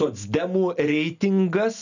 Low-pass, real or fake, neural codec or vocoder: 7.2 kHz; real; none